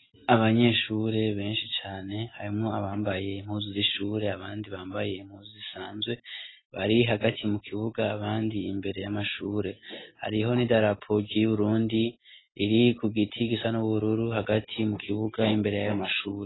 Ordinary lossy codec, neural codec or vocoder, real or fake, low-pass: AAC, 16 kbps; none; real; 7.2 kHz